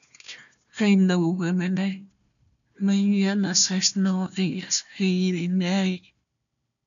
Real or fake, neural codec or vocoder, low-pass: fake; codec, 16 kHz, 1 kbps, FunCodec, trained on Chinese and English, 50 frames a second; 7.2 kHz